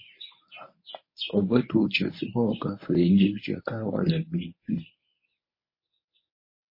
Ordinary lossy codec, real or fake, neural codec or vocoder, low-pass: MP3, 24 kbps; fake; codec, 24 kHz, 0.9 kbps, WavTokenizer, medium speech release version 1; 5.4 kHz